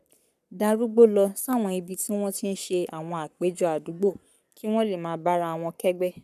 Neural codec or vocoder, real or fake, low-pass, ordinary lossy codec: codec, 44.1 kHz, 7.8 kbps, Pupu-Codec; fake; 14.4 kHz; none